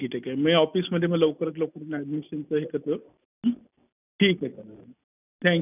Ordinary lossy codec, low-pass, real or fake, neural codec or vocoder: none; 3.6 kHz; real; none